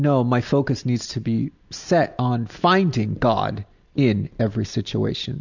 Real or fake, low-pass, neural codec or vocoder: real; 7.2 kHz; none